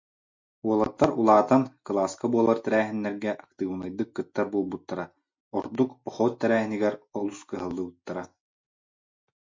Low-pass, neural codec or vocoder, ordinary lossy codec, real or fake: 7.2 kHz; none; MP3, 64 kbps; real